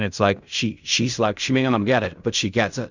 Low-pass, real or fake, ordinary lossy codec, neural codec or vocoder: 7.2 kHz; fake; Opus, 64 kbps; codec, 16 kHz in and 24 kHz out, 0.4 kbps, LongCat-Audio-Codec, fine tuned four codebook decoder